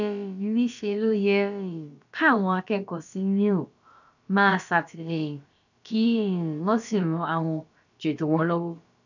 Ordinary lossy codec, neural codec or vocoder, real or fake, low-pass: none; codec, 16 kHz, about 1 kbps, DyCAST, with the encoder's durations; fake; 7.2 kHz